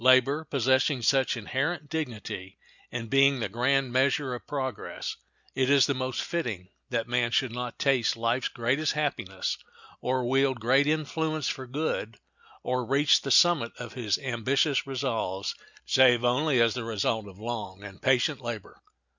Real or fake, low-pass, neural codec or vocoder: real; 7.2 kHz; none